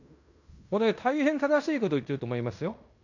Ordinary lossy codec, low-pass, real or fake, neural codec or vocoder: none; 7.2 kHz; fake; codec, 16 kHz in and 24 kHz out, 0.9 kbps, LongCat-Audio-Codec, fine tuned four codebook decoder